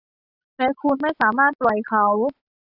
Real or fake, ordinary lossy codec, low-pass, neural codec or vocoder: real; AAC, 48 kbps; 5.4 kHz; none